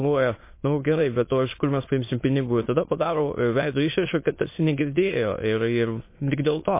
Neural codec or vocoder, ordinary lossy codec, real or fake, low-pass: autoencoder, 22.05 kHz, a latent of 192 numbers a frame, VITS, trained on many speakers; MP3, 24 kbps; fake; 3.6 kHz